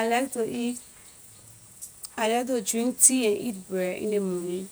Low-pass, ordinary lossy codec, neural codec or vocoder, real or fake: none; none; vocoder, 48 kHz, 128 mel bands, Vocos; fake